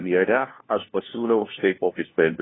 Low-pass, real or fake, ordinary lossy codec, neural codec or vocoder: 7.2 kHz; fake; AAC, 16 kbps; codec, 16 kHz, 1 kbps, FunCodec, trained on LibriTTS, 50 frames a second